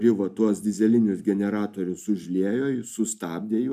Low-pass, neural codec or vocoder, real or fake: 14.4 kHz; none; real